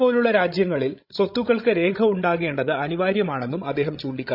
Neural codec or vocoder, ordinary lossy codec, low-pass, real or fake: codec, 16 kHz, 16 kbps, FreqCodec, larger model; none; 5.4 kHz; fake